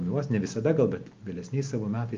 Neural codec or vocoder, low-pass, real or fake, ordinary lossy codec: none; 7.2 kHz; real; Opus, 24 kbps